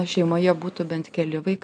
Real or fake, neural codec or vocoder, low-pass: real; none; 9.9 kHz